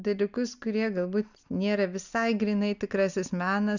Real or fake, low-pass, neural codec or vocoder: real; 7.2 kHz; none